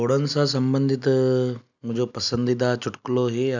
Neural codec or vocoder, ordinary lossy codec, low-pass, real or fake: none; none; 7.2 kHz; real